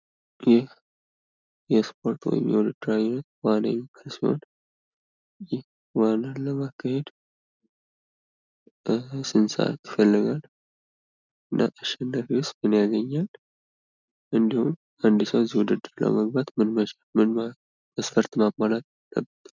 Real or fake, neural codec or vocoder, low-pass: real; none; 7.2 kHz